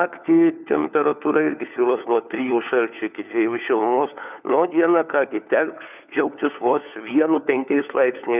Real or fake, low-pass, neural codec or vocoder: fake; 3.6 kHz; codec, 16 kHz, 4 kbps, FunCodec, trained on LibriTTS, 50 frames a second